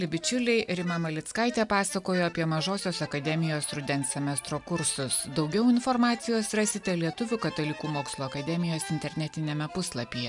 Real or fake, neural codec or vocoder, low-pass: real; none; 10.8 kHz